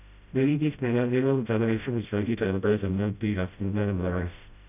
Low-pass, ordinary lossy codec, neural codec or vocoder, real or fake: 3.6 kHz; none; codec, 16 kHz, 0.5 kbps, FreqCodec, smaller model; fake